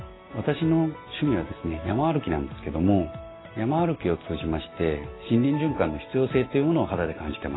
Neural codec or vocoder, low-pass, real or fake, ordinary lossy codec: none; 7.2 kHz; real; AAC, 16 kbps